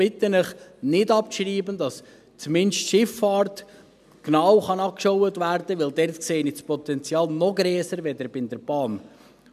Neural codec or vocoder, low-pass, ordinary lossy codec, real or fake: none; 14.4 kHz; none; real